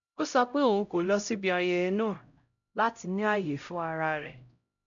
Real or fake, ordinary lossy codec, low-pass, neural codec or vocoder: fake; none; 7.2 kHz; codec, 16 kHz, 0.5 kbps, X-Codec, HuBERT features, trained on LibriSpeech